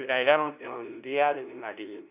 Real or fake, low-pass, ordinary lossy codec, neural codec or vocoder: fake; 3.6 kHz; none; codec, 16 kHz, 0.5 kbps, FunCodec, trained on LibriTTS, 25 frames a second